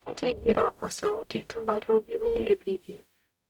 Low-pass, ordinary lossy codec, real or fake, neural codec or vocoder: 19.8 kHz; none; fake; codec, 44.1 kHz, 0.9 kbps, DAC